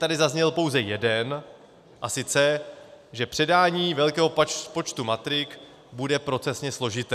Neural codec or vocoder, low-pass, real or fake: none; 14.4 kHz; real